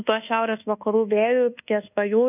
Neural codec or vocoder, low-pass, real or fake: codec, 24 kHz, 1.2 kbps, DualCodec; 3.6 kHz; fake